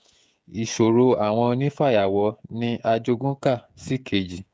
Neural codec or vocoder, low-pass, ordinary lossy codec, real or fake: codec, 16 kHz, 16 kbps, FreqCodec, smaller model; none; none; fake